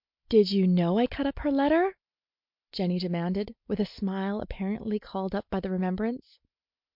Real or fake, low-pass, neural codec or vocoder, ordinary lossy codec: real; 5.4 kHz; none; MP3, 48 kbps